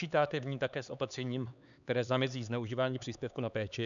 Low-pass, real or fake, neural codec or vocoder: 7.2 kHz; fake; codec, 16 kHz, 4 kbps, X-Codec, HuBERT features, trained on LibriSpeech